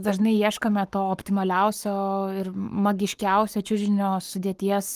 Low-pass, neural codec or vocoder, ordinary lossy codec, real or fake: 14.4 kHz; codec, 44.1 kHz, 7.8 kbps, Pupu-Codec; Opus, 32 kbps; fake